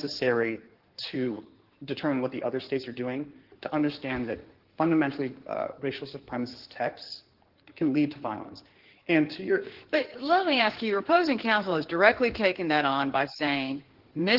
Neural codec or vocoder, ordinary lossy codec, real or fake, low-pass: codec, 16 kHz in and 24 kHz out, 2.2 kbps, FireRedTTS-2 codec; Opus, 16 kbps; fake; 5.4 kHz